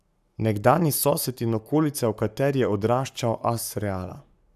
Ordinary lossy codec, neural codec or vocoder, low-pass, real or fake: none; codec, 44.1 kHz, 7.8 kbps, Pupu-Codec; 14.4 kHz; fake